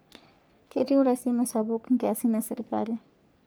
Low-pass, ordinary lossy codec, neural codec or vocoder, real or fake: none; none; codec, 44.1 kHz, 3.4 kbps, Pupu-Codec; fake